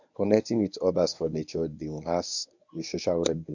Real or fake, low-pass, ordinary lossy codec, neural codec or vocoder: fake; 7.2 kHz; none; codec, 24 kHz, 0.9 kbps, WavTokenizer, medium speech release version 1